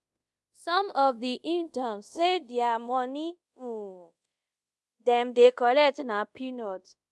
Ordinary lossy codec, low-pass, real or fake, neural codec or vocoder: none; none; fake; codec, 24 kHz, 0.5 kbps, DualCodec